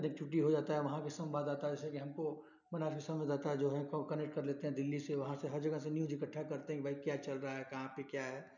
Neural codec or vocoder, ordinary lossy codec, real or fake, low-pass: none; none; real; 7.2 kHz